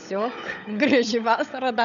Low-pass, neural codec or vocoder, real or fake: 7.2 kHz; codec, 16 kHz, 16 kbps, FunCodec, trained on LibriTTS, 50 frames a second; fake